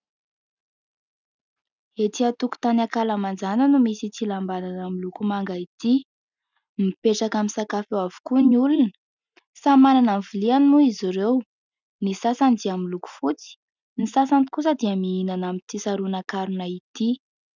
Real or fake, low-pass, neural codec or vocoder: real; 7.2 kHz; none